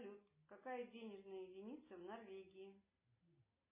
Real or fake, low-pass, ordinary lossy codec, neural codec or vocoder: real; 3.6 kHz; MP3, 16 kbps; none